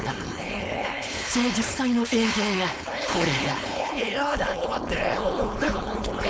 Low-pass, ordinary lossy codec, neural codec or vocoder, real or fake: none; none; codec, 16 kHz, 4.8 kbps, FACodec; fake